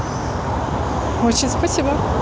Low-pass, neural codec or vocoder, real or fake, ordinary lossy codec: none; none; real; none